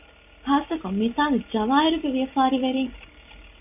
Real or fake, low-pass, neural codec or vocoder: real; 3.6 kHz; none